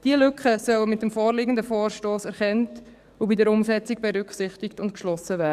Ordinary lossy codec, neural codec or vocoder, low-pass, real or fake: Opus, 64 kbps; codec, 44.1 kHz, 7.8 kbps, DAC; 14.4 kHz; fake